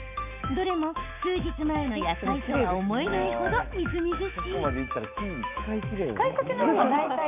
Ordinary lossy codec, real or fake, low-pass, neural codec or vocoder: none; fake; 3.6 kHz; autoencoder, 48 kHz, 128 numbers a frame, DAC-VAE, trained on Japanese speech